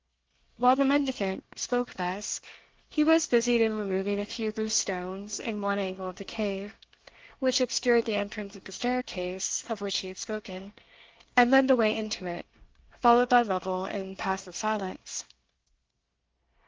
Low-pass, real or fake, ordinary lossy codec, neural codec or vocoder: 7.2 kHz; fake; Opus, 16 kbps; codec, 24 kHz, 1 kbps, SNAC